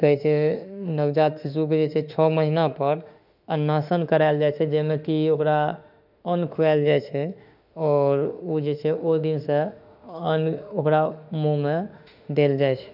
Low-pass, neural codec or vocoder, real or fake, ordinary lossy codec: 5.4 kHz; autoencoder, 48 kHz, 32 numbers a frame, DAC-VAE, trained on Japanese speech; fake; none